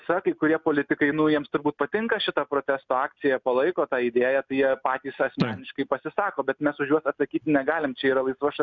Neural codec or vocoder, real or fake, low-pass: none; real; 7.2 kHz